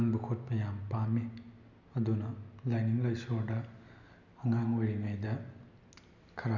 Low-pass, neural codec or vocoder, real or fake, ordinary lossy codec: 7.2 kHz; none; real; none